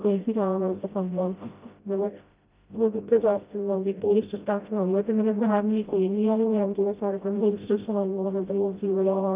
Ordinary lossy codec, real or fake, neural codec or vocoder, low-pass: Opus, 24 kbps; fake; codec, 16 kHz, 0.5 kbps, FreqCodec, smaller model; 3.6 kHz